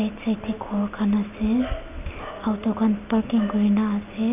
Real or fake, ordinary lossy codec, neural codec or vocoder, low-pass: real; none; none; 3.6 kHz